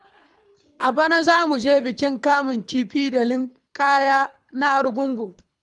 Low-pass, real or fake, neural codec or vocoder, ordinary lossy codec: 10.8 kHz; fake; codec, 24 kHz, 3 kbps, HILCodec; none